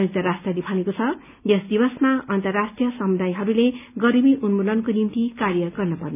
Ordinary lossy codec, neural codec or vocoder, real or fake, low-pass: none; none; real; 3.6 kHz